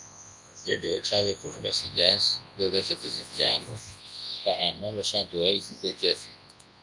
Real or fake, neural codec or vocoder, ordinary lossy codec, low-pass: fake; codec, 24 kHz, 0.9 kbps, WavTokenizer, large speech release; MP3, 96 kbps; 10.8 kHz